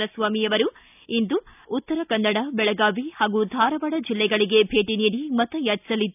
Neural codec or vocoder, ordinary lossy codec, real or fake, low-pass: none; none; real; 3.6 kHz